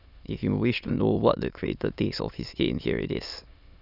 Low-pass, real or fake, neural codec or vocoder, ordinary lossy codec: 5.4 kHz; fake; autoencoder, 22.05 kHz, a latent of 192 numbers a frame, VITS, trained on many speakers; none